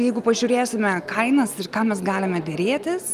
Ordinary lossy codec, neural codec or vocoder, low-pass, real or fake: Opus, 32 kbps; none; 14.4 kHz; real